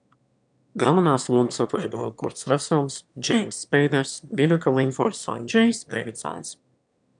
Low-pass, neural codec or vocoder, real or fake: 9.9 kHz; autoencoder, 22.05 kHz, a latent of 192 numbers a frame, VITS, trained on one speaker; fake